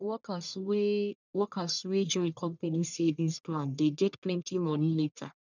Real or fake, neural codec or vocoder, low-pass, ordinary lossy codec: fake; codec, 44.1 kHz, 1.7 kbps, Pupu-Codec; 7.2 kHz; none